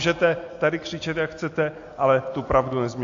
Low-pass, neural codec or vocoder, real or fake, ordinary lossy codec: 7.2 kHz; none; real; AAC, 48 kbps